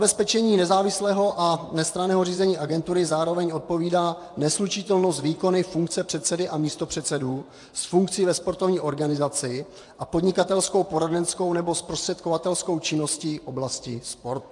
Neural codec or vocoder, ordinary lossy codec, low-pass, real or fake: vocoder, 24 kHz, 100 mel bands, Vocos; AAC, 64 kbps; 10.8 kHz; fake